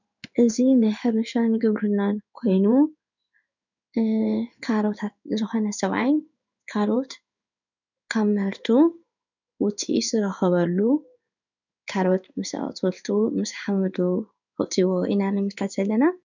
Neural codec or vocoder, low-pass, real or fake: codec, 16 kHz in and 24 kHz out, 1 kbps, XY-Tokenizer; 7.2 kHz; fake